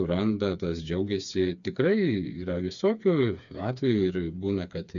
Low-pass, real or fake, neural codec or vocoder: 7.2 kHz; fake; codec, 16 kHz, 4 kbps, FreqCodec, smaller model